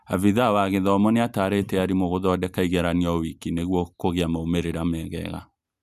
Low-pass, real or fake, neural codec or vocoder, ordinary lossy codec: 14.4 kHz; real; none; Opus, 64 kbps